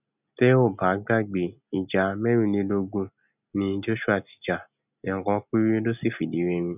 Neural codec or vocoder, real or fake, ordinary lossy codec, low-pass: none; real; none; 3.6 kHz